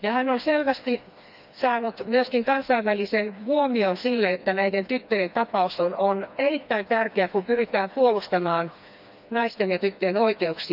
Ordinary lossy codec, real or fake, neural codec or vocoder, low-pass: none; fake; codec, 16 kHz, 2 kbps, FreqCodec, smaller model; 5.4 kHz